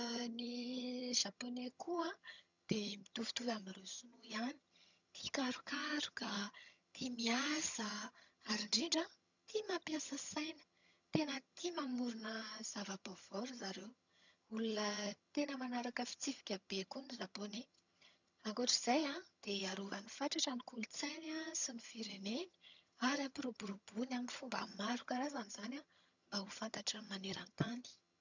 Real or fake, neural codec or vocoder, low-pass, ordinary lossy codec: fake; vocoder, 22.05 kHz, 80 mel bands, HiFi-GAN; 7.2 kHz; none